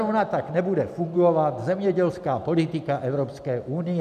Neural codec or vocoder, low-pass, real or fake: none; 14.4 kHz; real